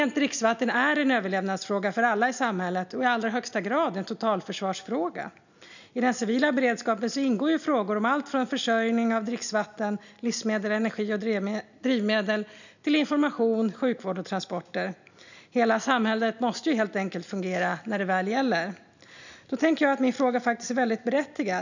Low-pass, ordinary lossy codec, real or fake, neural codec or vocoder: 7.2 kHz; none; real; none